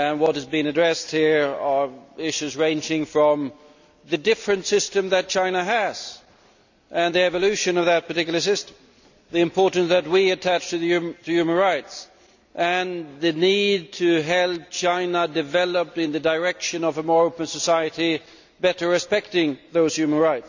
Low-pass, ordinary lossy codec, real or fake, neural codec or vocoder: 7.2 kHz; none; real; none